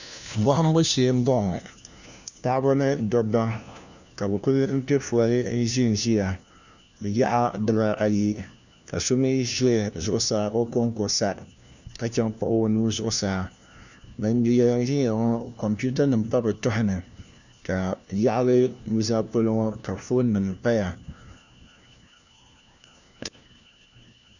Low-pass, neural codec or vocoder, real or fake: 7.2 kHz; codec, 16 kHz, 1 kbps, FunCodec, trained on LibriTTS, 50 frames a second; fake